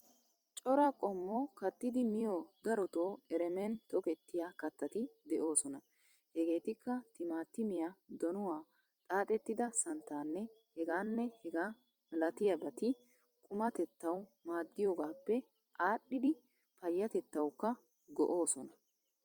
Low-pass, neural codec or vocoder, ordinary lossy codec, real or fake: 19.8 kHz; vocoder, 44.1 kHz, 128 mel bands every 256 samples, BigVGAN v2; Opus, 64 kbps; fake